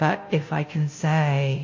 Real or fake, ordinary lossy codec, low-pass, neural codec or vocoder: fake; MP3, 32 kbps; 7.2 kHz; codec, 16 kHz, 0.5 kbps, FunCodec, trained on Chinese and English, 25 frames a second